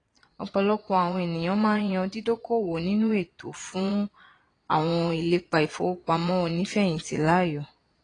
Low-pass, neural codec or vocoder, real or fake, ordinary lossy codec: 9.9 kHz; vocoder, 22.05 kHz, 80 mel bands, Vocos; fake; AAC, 32 kbps